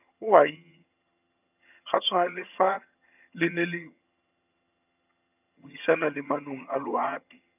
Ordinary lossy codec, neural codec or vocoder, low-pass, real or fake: none; vocoder, 22.05 kHz, 80 mel bands, HiFi-GAN; 3.6 kHz; fake